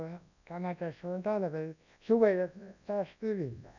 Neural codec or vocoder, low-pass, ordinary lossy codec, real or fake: codec, 24 kHz, 0.9 kbps, WavTokenizer, large speech release; 7.2 kHz; none; fake